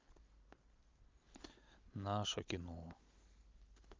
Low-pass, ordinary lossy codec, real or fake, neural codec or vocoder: 7.2 kHz; Opus, 32 kbps; real; none